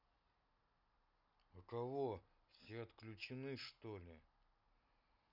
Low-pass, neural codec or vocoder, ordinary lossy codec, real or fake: 5.4 kHz; none; none; real